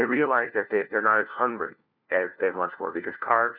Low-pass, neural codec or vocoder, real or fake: 5.4 kHz; codec, 16 kHz, 1 kbps, FunCodec, trained on LibriTTS, 50 frames a second; fake